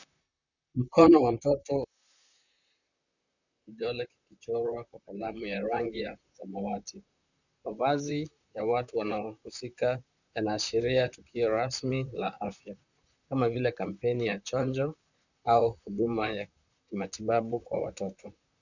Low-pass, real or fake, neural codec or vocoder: 7.2 kHz; fake; vocoder, 44.1 kHz, 128 mel bands, Pupu-Vocoder